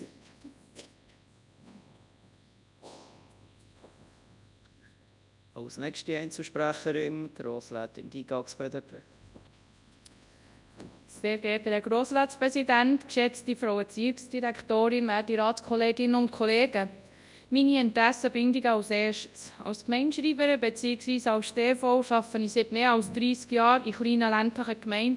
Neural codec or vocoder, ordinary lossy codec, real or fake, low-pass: codec, 24 kHz, 0.9 kbps, WavTokenizer, large speech release; none; fake; 10.8 kHz